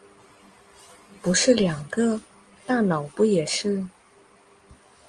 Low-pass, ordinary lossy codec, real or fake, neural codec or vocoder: 9.9 kHz; Opus, 24 kbps; real; none